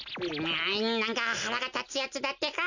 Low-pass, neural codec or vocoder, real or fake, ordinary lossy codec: 7.2 kHz; none; real; none